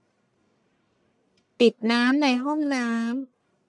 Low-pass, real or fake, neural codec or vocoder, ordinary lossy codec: 10.8 kHz; fake; codec, 44.1 kHz, 1.7 kbps, Pupu-Codec; none